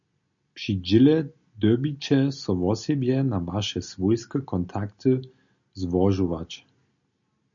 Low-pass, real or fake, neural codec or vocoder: 7.2 kHz; real; none